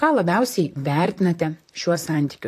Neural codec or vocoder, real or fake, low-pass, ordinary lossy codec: vocoder, 44.1 kHz, 128 mel bands, Pupu-Vocoder; fake; 14.4 kHz; AAC, 64 kbps